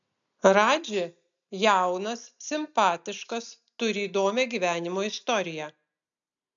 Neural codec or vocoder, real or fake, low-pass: none; real; 7.2 kHz